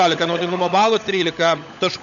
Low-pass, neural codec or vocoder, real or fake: 7.2 kHz; codec, 16 kHz, 16 kbps, FunCodec, trained on LibriTTS, 50 frames a second; fake